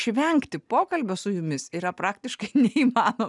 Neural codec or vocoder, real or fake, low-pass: none; real; 10.8 kHz